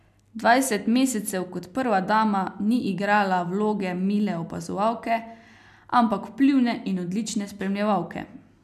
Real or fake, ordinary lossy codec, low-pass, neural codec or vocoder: real; none; 14.4 kHz; none